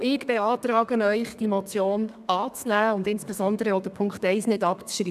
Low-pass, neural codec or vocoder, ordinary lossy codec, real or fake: 14.4 kHz; codec, 32 kHz, 1.9 kbps, SNAC; none; fake